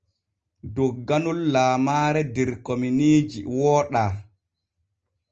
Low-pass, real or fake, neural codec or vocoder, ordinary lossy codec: 7.2 kHz; real; none; Opus, 24 kbps